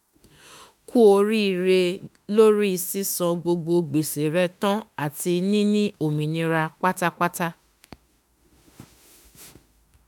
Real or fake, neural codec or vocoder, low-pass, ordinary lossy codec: fake; autoencoder, 48 kHz, 32 numbers a frame, DAC-VAE, trained on Japanese speech; none; none